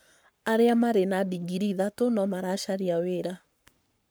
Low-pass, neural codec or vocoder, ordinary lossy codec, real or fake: none; codec, 44.1 kHz, 7.8 kbps, Pupu-Codec; none; fake